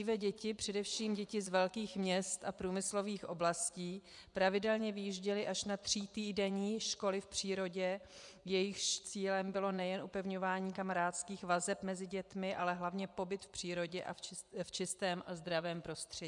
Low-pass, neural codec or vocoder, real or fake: 10.8 kHz; vocoder, 24 kHz, 100 mel bands, Vocos; fake